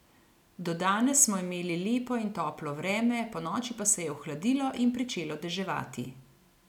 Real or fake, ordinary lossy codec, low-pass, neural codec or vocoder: real; none; 19.8 kHz; none